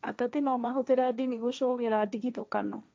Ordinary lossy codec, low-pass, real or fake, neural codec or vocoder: none; none; fake; codec, 16 kHz, 1.1 kbps, Voila-Tokenizer